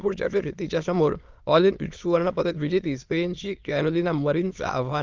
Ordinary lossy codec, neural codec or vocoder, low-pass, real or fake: Opus, 32 kbps; autoencoder, 22.05 kHz, a latent of 192 numbers a frame, VITS, trained on many speakers; 7.2 kHz; fake